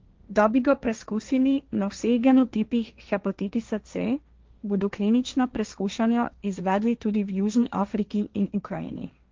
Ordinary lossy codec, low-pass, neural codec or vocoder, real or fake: Opus, 24 kbps; 7.2 kHz; codec, 16 kHz, 1.1 kbps, Voila-Tokenizer; fake